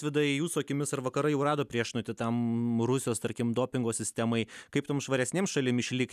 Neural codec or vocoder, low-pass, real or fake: none; 14.4 kHz; real